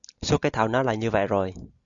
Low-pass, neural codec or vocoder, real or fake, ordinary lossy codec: 7.2 kHz; none; real; Opus, 64 kbps